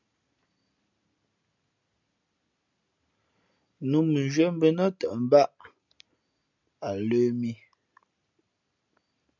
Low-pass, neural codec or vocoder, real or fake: 7.2 kHz; none; real